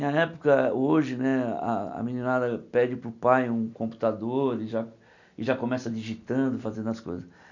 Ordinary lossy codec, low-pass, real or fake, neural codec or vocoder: none; 7.2 kHz; real; none